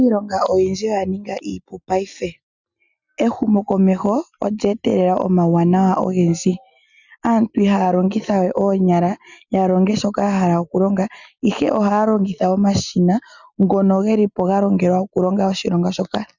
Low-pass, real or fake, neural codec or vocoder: 7.2 kHz; real; none